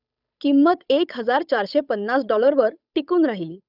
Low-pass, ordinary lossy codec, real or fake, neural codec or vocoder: 5.4 kHz; none; fake; codec, 16 kHz, 8 kbps, FunCodec, trained on Chinese and English, 25 frames a second